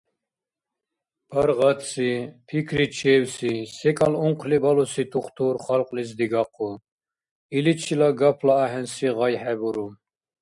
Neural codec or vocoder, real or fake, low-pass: none; real; 10.8 kHz